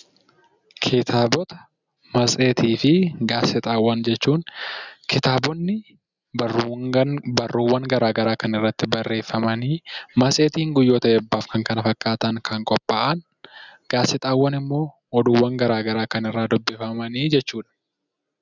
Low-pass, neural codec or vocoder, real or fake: 7.2 kHz; none; real